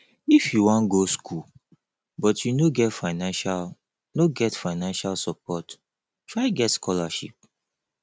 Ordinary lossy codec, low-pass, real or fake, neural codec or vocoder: none; none; real; none